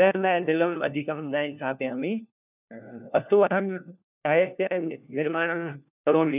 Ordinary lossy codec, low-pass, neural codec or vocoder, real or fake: none; 3.6 kHz; codec, 16 kHz, 1 kbps, FunCodec, trained on LibriTTS, 50 frames a second; fake